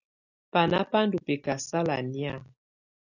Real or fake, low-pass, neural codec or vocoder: real; 7.2 kHz; none